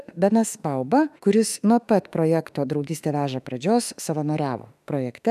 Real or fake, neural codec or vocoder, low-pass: fake; autoencoder, 48 kHz, 32 numbers a frame, DAC-VAE, trained on Japanese speech; 14.4 kHz